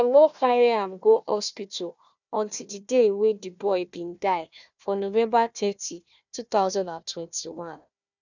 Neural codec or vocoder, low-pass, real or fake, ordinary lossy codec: codec, 16 kHz, 1 kbps, FunCodec, trained on Chinese and English, 50 frames a second; 7.2 kHz; fake; none